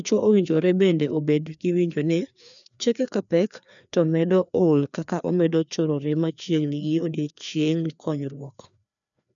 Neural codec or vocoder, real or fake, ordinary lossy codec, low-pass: codec, 16 kHz, 2 kbps, FreqCodec, larger model; fake; none; 7.2 kHz